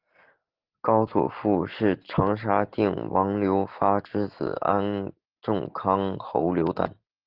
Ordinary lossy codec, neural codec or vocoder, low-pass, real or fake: Opus, 16 kbps; none; 5.4 kHz; real